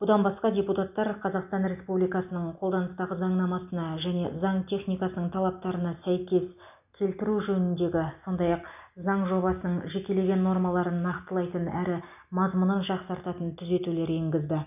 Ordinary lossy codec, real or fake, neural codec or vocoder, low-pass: none; real; none; 3.6 kHz